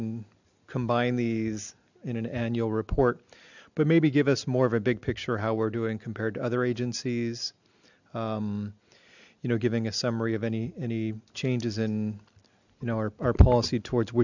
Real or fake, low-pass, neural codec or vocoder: real; 7.2 kHz; none